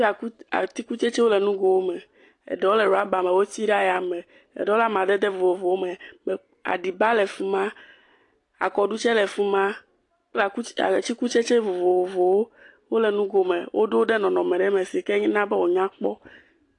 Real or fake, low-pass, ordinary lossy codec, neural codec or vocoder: real; 10.8 kHz; AAC, 48 kbps; none